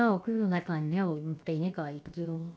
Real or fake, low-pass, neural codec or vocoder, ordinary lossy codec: fake; none; codec, 16 kHz, about 1 kbps, DyCAST, with the encoder's durations; none